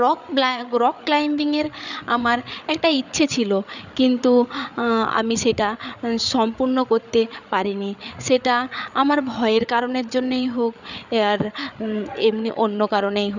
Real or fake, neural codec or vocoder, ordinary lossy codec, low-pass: fake; codec, 16 kHz, 8 kbps, FreqCodec, larger model; none; 7.2 kHz